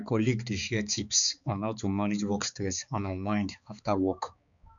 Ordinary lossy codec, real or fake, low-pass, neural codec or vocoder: none; fake; 7.2 kHz; codec, 16 kHz, 4 kbps, X-Codec, HuBERT features, trained on balanced general audio